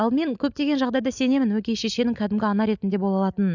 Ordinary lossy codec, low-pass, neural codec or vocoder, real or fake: none; 7.2 kHz; none; real